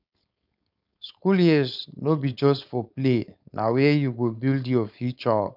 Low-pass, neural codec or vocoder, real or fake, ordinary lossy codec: 5.4 kHz; codec, 16 kHz, 4.8 kbps, FACodec; fake; none